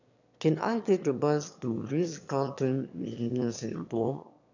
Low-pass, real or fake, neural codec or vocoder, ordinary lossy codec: 7.2 kHz; fake; autoencoder, 22.05 kHz, a latent of 192 numbers a frame, VITS, trained on one speaker; none